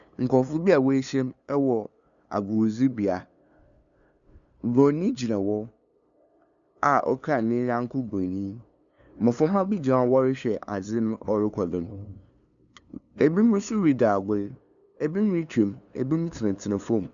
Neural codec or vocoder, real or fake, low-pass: codec, 16 kHz, 2 kbps, FunCodec, trained on LibriTTS, 25 frames a second; fake; 7.2 kHz